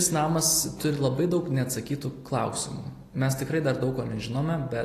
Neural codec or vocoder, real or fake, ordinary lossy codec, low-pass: none; real; AAC, 48 kbps; 14.4 kHz